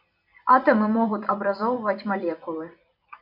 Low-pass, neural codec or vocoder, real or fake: 5.4 kHz; vocoder, 44.1 kHz, 128 mel bands every 256 samples, BigVGAN v2; fake